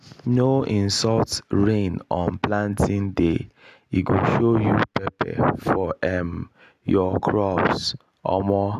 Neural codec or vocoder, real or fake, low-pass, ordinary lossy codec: none; real; 14.4 kHz; none